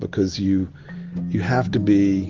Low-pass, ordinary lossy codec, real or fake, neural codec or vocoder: 7.2 kHz; Opus, 16 kbps; real; none